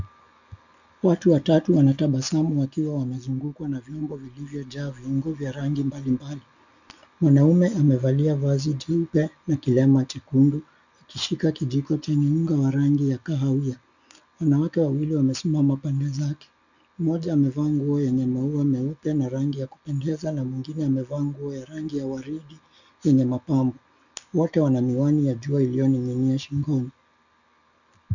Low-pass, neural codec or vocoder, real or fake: 7.2 kHz; none; real